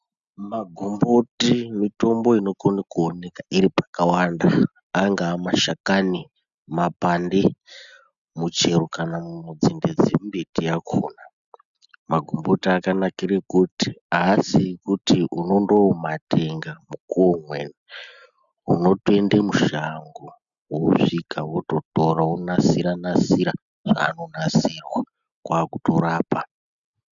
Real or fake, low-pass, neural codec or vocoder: real; 7.2 kHz; none